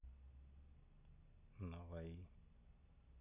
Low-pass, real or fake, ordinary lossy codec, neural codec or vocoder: 3.6 kHz; real; none; none